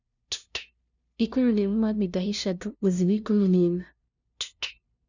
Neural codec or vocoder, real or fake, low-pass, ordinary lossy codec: codec, 16 kHz, 0.5 kbps, FunCodec, trained on LibriTTS, 25 frames a second; fake; 7.2 kHz; none